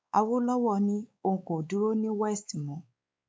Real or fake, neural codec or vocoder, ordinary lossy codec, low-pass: fake; codec, 16 kHz, 4 kbps, X-Codec, WavLM features, trained on Multilingual LibriSpeech; none; none